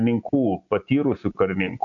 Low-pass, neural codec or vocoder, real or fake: 7.2 kHz; none; real